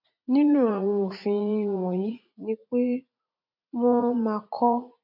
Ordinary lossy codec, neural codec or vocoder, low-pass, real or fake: none; vocoder, 44.1 kHz, 80 mel bands, Vocos; 5.4 kHz; fake